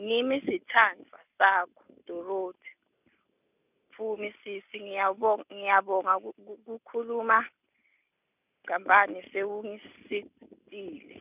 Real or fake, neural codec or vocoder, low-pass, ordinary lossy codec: real; none; 3.6 kHz; none